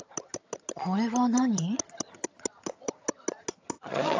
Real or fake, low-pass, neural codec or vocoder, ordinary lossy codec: fake; 7.2 kHz; vocoder, 22.05 kHz, 80 mel bands, HiFi-GAN; AAC, 48 kbps